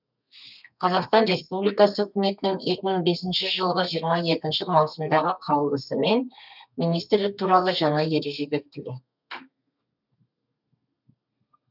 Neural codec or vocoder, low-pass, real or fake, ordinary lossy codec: codec, 32 kHz, 1.9 kbps, SNAC; 5.4 kHz; fake; none